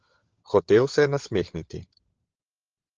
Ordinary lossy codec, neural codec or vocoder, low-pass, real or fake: Opus, 16 kbps; codec, 16 kHz, 16 kbps, FunCodec, trained on LibriTTS, 50 frames a second; 7.2 kHz; fake